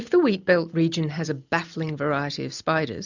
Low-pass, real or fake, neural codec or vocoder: 7.2 kHz; real; none